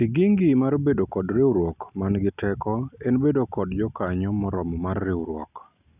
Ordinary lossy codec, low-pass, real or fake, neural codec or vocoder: none; 3.6 kHz; real; none